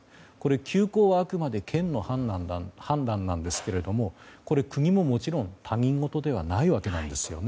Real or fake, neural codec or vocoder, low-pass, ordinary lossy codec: real; none; none; none